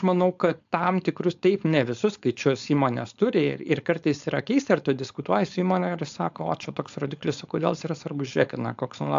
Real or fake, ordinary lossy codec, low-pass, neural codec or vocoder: fake; AAC, 64 kbps; 7.2 kHz; codec, 16 kHz, 4.8 kbps, FACodec